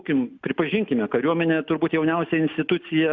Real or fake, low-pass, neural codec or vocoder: real; 7.2 kHz; none